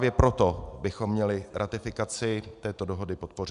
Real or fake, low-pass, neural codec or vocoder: real; 10.8 kHz; none